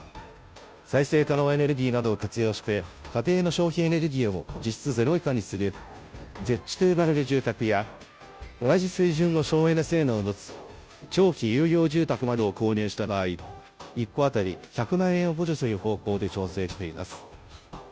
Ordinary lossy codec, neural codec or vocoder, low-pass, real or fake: none; codec, 16 kHz, 0.5 kbps, FunCodec, trained on Chinese and English, 25 frames a second; none; fake